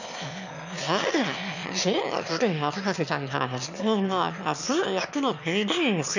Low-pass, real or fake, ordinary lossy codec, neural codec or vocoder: 7.2 kHz; fake; none; autoencoder, 22.05 kHz, a latent of 192 numbers a frame, VITS, trained on one speaker